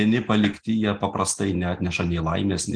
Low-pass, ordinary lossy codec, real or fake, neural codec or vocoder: 9.9 kHz; Opus, 24 kbps; real; none